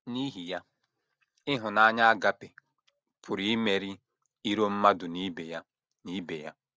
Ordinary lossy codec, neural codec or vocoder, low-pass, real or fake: none; none; none; real